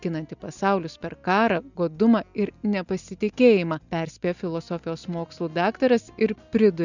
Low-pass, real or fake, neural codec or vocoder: 7.2 kHz; real; none